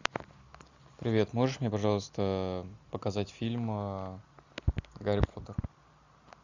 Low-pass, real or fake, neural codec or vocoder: 7.2 kHz; real; none